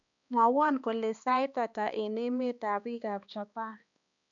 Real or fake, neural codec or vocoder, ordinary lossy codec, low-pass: fake; codec, 16 kHz, 2 kbps, X-Codec, HuBERT features, trained on balanced general audio; none; 7.2 kHz